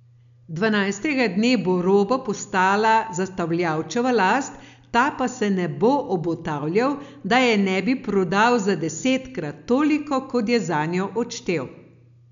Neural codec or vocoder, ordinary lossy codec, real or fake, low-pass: none; none; real; 7.2 kHz